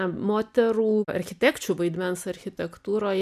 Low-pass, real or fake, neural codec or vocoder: 14.4 kHz; real; none